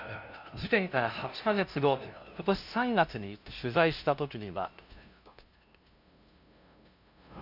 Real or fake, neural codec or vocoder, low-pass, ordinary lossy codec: fake; codec, 16 kHz, 0.5 kbps, FunCodec, trained on LibriTTS, 25 frames a second; 5.4 kHz; none